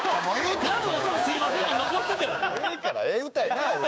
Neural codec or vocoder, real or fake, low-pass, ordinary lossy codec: codec, 16 kHz, 6 kbps, DAC; fake; none; none